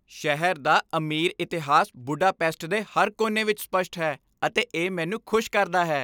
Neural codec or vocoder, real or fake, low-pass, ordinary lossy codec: none; real; none; none